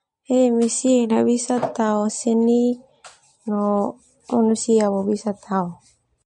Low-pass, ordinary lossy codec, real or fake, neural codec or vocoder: 10.8 kHz; MP3, 48 kbps; real; none